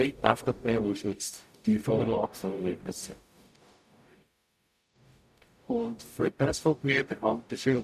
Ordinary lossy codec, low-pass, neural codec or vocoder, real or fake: none; 14.4 kHz; codec, 44.1 kHz, 0.9 kbps, DAC; fake